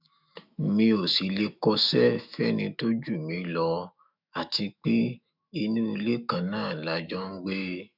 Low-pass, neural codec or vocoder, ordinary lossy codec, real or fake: 5.4 kHz; autoencoder, 48 kHz, 128 numbers a frame, DAC-VAE, trained on Japanese speech; none; fake